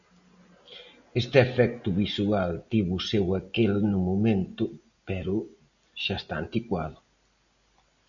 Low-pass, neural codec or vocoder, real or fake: 7.2 kHz; none; real